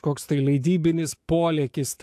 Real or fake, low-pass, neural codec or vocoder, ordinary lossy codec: fake; 14.4 kHz; autoencoder, 48 kHz, 128 numbers a frame, DAC-VAE, trained on Japanese speech; AAC, 64 kbps